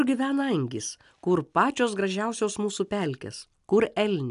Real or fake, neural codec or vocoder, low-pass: real; none; 10.8 kHz